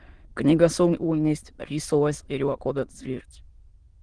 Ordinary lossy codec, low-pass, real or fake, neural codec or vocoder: Opus, 16 kbps; 9.9 kHz; fake; autoencoder, 22.05 kHz, a latent of 192 numbers a frame, VITS, trained on many speakers